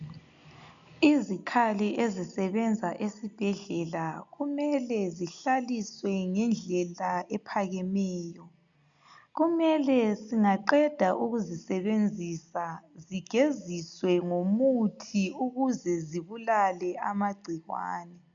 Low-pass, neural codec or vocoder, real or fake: 7.2 kHz; none; real